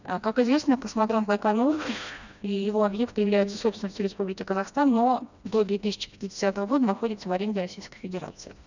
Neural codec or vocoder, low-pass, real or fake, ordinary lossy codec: codec, 16 kHz, 1 kbps, FreqCodec, smaller model; 7.2 kHz; fake; none